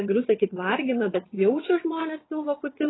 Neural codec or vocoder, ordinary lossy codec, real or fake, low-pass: vocoder, 22.05 kHz, 80 mel bands, WaveNeXt; AAC, 16 kbps; fake; 7.2 kHz